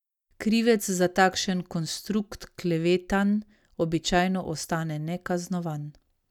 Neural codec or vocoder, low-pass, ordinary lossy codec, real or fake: none; 19.8 kHz; none; real